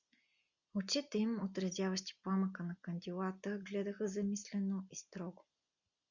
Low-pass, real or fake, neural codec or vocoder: 7.2 kHz; real; none